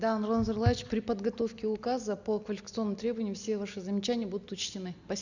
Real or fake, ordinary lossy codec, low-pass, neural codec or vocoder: real; none; 7.2 kHz; none